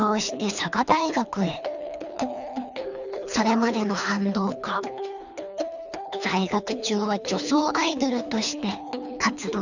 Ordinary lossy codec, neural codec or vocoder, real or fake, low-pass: none; codec, 24 kHz, 3 kbps, HILCodec; fake; 7.2 kHz